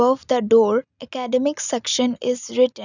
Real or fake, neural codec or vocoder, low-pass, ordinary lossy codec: real; none; 7.2 kHz; none